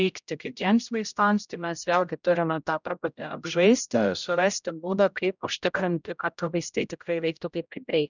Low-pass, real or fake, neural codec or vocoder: 7.2 kHz; fake; codec, 16 kHz, 0.5 kbps, X-Codec, HuBERT features, trained on general audio